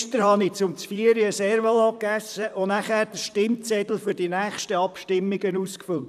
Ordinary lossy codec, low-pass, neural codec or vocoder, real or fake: none; 14.4 kHz; vocoder, 44.1 kHz, 128 mel bands, Pupu-Vocoder; fake